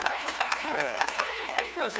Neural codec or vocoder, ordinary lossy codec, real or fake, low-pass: codec, 16 kHz, 1 kbps, FunCodec, trained on LibriTTS, 50 frames a second; none; fake; none